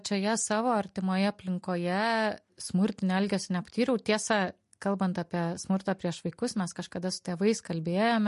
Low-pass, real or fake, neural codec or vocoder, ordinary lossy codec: 14.4 kHz; real; none; MP3, 48 kbps